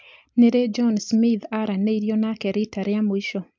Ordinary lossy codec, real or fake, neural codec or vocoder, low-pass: MP3, 64 kbps; real; none; 7.2 kHz